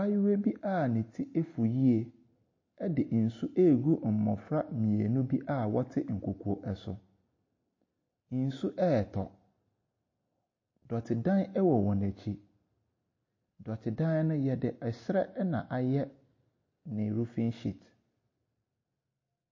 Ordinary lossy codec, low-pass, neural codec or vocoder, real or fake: MP3, 32 kbps; 7.2 kHz; none; real